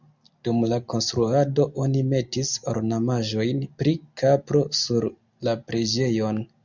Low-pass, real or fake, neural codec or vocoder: 7.2 kHz; real; none